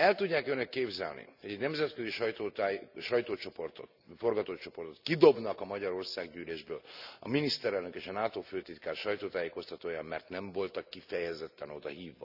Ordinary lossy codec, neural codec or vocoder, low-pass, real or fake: none; none; 5.4 kHz; real